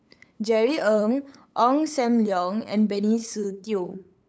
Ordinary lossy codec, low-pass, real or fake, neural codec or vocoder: none; none; fake; codec, 16 kHz, 8 kbps, FunCodec, trained on LibriTTS, 25 frames a second